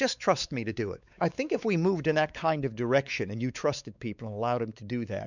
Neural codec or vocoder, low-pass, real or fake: codec, 16 kHz, 4 kbps, X-Codec, WavLM features, trained on Multilingual LibriSpeech; 7.2 kHz; fake